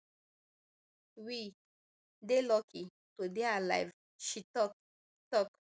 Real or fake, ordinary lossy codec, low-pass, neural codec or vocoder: real; none; none; none